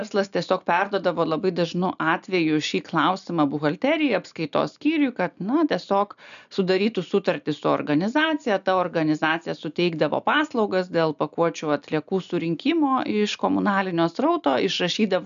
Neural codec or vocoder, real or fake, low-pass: none; real; 7.2 kHz